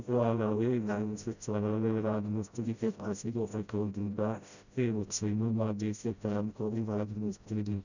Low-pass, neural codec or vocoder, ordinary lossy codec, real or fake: 7.2 kHz; codec, 16 kHz, 0.5 kbps, FreqCodec, smaller model; none; fake